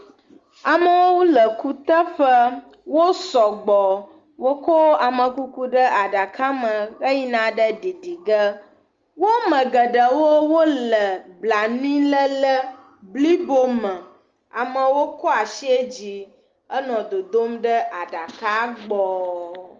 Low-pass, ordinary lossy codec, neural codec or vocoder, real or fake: 7.2 kHz; Opus, 32 kbps; none; real